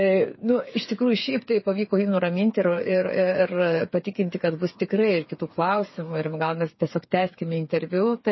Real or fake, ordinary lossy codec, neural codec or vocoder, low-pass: fake; MP3, 24 kbps; codec, 16 kHz, 8 kbps, FreqCodec, smaller model; 7.2 kHz